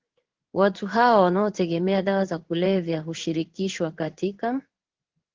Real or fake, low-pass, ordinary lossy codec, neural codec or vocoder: fake; 7.2 kHz; Opus, 16 kbps; codec, 16 kHz in and 24 kHz out, 1 kbps, XY-Tokenizer